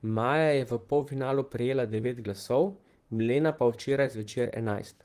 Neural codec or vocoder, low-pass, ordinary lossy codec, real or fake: vocoder, 44.1 kHz, 128 mel bands, Pupu-Vocoder; 14.4 kHz; Opus, 24 kbps; fake